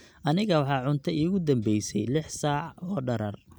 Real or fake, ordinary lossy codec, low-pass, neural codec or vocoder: real; none; none; none